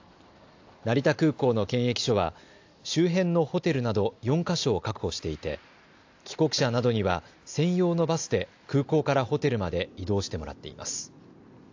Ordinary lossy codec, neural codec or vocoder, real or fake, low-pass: AAC, 48 kbps; none; real; 7.2 kHz